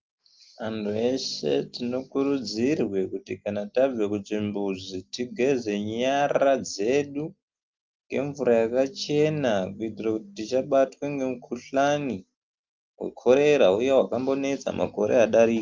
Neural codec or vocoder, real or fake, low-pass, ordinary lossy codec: none; real; 7.2 kHz; Opus, 32 kbps